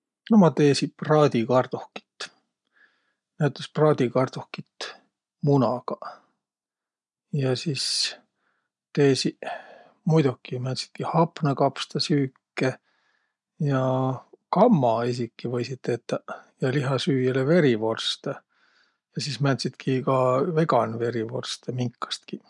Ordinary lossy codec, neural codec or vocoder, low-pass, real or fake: none; none; 10.8 kHz; real